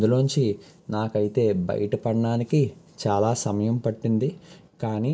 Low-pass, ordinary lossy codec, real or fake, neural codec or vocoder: none; none; real; none